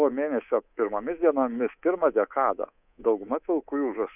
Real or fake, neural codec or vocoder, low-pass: fake; codec, 24 kHz, 3.1 kbps, DualCodec; 3.6 kHz